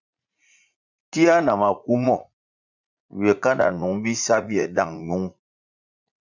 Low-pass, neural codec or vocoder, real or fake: 7.2 kHz; vocoder, 44.1 kHz, 80 mel bands, Vocos; fake